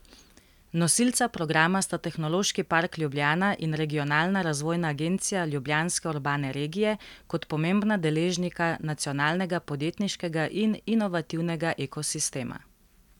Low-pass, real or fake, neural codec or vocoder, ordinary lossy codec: 19.8 kHz; real; none; none